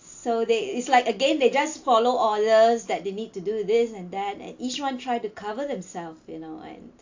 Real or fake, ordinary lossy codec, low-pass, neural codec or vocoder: real; AAC, 48 kbps; 7.2 kHz; none